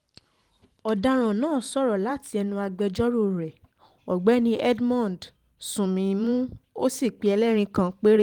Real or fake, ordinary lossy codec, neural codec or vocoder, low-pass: fake; Opus, 24 kbps; vocoder, 44.1 kHz, 128 mel bands every 512 samples, BigVGAN v2; 19.8 kHz